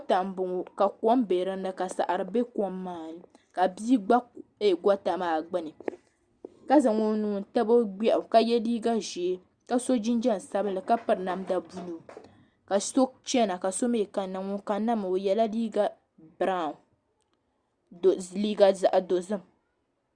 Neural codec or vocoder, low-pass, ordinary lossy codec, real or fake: none; 9.9 kHz; Opus, 64 kbps; real